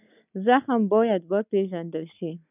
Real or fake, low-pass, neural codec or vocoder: real; 3.6 kHz; none